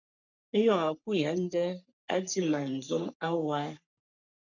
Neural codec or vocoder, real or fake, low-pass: codec, 44.1 kHz, 3.4 kbps, Pupu-Codec; fake; 7.2 kHz